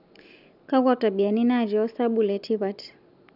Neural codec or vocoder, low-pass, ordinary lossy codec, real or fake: none; 5.4 kHz; none; real